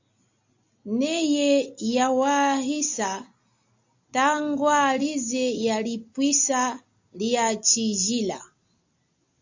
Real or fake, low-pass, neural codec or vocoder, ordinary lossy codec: real; 7.2 kHz; none; AAC, 48 kbps